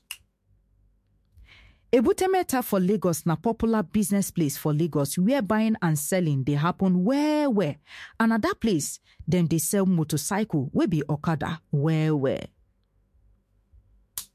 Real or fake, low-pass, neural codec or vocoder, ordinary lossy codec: fake; 14.4 kHz; autoencoder, 48 kHz, 128 numbers a frame, DAC-VAE, trained on Japanese speech; MP3, 64 kbps